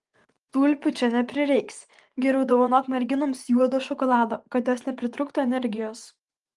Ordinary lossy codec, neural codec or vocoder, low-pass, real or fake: Opus, 24 kbps; vocoder, 24 kHz, 100 mel bands, Vocos; 10.8 kHz; fake